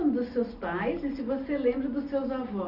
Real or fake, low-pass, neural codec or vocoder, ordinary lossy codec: real; 5.4 kHz; none; none